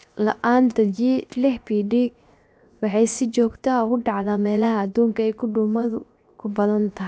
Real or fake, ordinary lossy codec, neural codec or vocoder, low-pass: fake; none; codec, 16 kHz, 0.7 kbps, FocalCodec; none